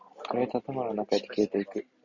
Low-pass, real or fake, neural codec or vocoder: 7.2 kHz; real; none